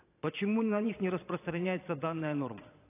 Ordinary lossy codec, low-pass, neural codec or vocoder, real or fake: AAC, 24 kbps; 3.6 kHz; vocoder, 22.05 kHz, 80 mel bands, Vocos; fake